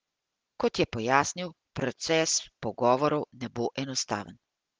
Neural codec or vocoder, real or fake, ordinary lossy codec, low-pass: none; real; Opus, 16 kbps; 7.2 kHz